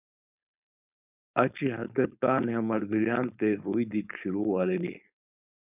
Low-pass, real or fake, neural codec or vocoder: 3.6 kHz; fake; codec, 16 kHz, 4.8 kbps, FACodec